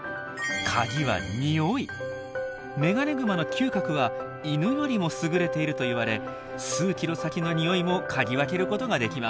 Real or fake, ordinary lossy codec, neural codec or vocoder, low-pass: real; none; none; none